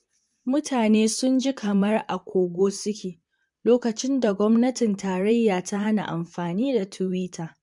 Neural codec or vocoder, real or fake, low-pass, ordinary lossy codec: vocoder, 44.1 kHz, 128 mel bands, Pupu-Vocoder; fake; 10.8 kHz; MP3, 64 kbps